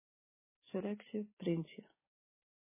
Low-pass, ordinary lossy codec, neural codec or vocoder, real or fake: 3.6 kHz; MP3, 16 kbps; codec, 44.1 kHz, 7.8 kbps, DAC; fake